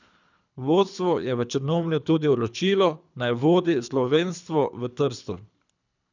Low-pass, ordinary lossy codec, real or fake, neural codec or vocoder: 7.2 kHz; none; fake; codec, 24 kHz, 3 kbps, HILCodec